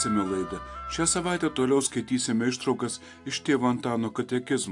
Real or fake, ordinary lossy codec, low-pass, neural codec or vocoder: real; AAC, 64 kbps; 10.8 kHz; none